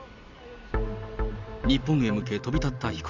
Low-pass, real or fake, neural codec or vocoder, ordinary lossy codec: 7.2 kHz; real; none; none